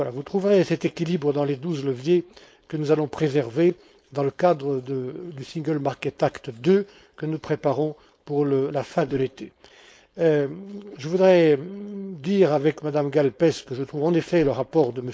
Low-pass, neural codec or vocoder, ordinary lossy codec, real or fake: none; codec, 16 kHz, 4.8 kbps, FACodec; none; fake